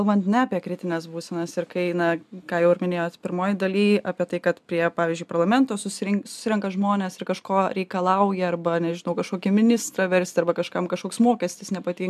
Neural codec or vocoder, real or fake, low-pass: none; real; 14.4 kHz